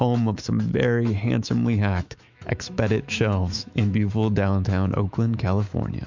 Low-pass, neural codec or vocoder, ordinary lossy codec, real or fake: 7.2 kHz; autoencoder, 48 kHz, 128 numbers a frame, DAC-VAE, trained on Japanese speech; MP3, 64 kbps; fake